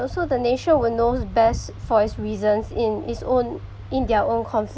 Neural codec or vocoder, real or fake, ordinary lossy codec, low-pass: none; real; none; none